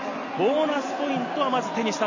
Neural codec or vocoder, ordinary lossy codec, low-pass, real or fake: none; none; 7.2 kHz; real